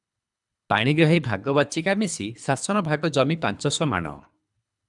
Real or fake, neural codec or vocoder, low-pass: fake; codec, 24 kHz, 3 kbps, HILCodec; 10.8 kHz